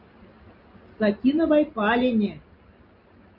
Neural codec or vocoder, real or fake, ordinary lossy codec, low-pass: none; real; Opus, 64 kbps; 5.4 kHz